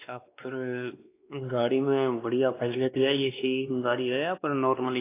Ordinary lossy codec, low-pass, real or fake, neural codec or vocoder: AAC, 24 kbps; 3.6 kHz; fake; codec, 16 kHz, 2 kbps, X-Codec, WavLM features, trained on Multilingual LibriSpeech